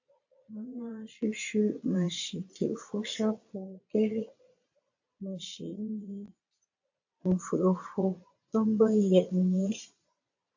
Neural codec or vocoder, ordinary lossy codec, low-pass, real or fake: vocoder, 22.05 kHz, 80 mel bands, Vocos; AAC, 48 kbps; 7.2 kHz; fake